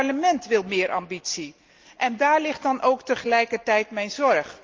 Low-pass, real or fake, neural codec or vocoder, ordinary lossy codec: 7.2 kHz; real; none; Opus, 24 kbps